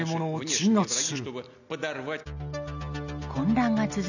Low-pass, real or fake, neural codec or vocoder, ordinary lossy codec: 7.2 kHz; real; none; none